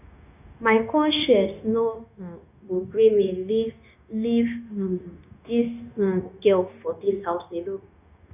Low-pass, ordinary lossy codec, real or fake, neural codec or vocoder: 3.6 kHz; none; fake; codec, 16 kHz, 0.9 kbps, LongCat-Audio-Codec